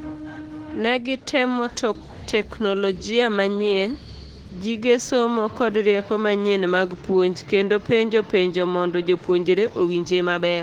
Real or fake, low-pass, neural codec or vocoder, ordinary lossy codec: fake; 19.8 kHz; autoencoder, 48 kHz, 32 numbers a frame, DAC-VAE, trained on Japanese speech; Opus, 16 kbps